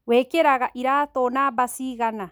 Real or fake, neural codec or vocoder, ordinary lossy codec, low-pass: real; none; none; none